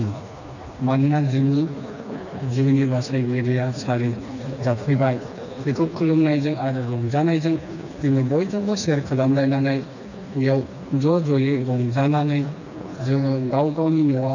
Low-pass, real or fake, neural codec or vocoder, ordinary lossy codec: 7.2 kHz; fake; codec, 16 kHz, 2 kbps, FreqCodec, smaller model; none